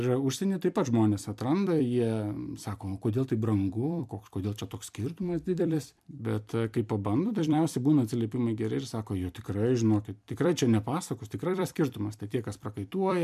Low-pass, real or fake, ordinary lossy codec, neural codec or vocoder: 14.4 kHz; fake; MP3, 96 kbps; vocoder, 44.1 kHz, 128 mel bands every 256 samples, BigVGAN v2